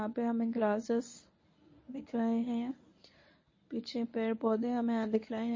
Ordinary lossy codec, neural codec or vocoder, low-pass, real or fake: MP3, 32 kbps; codec, 24 kHz, 0.9 kbps, WavTokenizer, medium speech release version 1; 7.2 kHz; fake